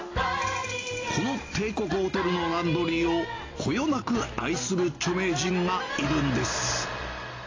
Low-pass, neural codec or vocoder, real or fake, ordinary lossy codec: 7.2 kHz; none; real; AAC, 32 kbps